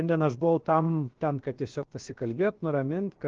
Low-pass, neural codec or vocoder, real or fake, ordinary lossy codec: 7.2 kHz; codec, 16 kHz, about 1 kbps, DyCAST, with the encoder's durations; fake; Opus, 16 kbps